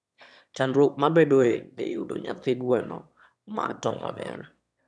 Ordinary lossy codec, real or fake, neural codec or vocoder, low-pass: none; fake; autoencoder, 22.05 kHz, a latent of 192 numbers a frame, VITS, trained on one speaker; none